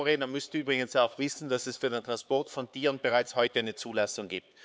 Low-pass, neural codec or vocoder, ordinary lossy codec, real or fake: none; codec, 16 kHz, 4 kbps, X-Codec, HuBERT features, trained on LibriSpeech; none; fake